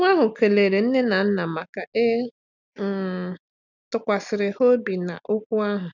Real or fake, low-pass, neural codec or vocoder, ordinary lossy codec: real; 7.2 kHz; none; none